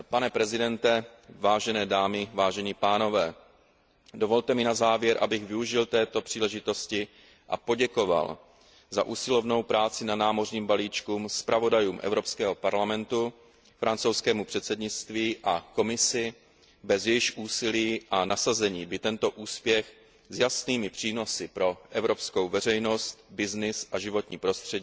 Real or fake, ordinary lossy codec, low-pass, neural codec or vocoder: real; none; none; none